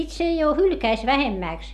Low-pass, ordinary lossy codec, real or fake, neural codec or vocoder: 14.4 kHz; AAC, 96 kbps; real; none